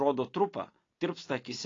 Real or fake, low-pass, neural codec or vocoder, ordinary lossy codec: real; 7.2 kHz; none; AAC, 32 kbps